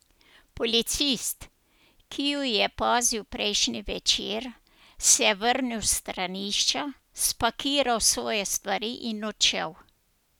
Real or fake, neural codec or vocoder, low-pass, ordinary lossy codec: real; none; none; none